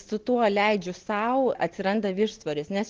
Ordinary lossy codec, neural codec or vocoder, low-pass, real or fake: Opus, 16 kbps; none; 7.2 kHz; real